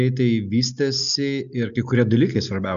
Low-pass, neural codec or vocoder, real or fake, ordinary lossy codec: 7.2 kHz; none; real; AAC, 96 kbps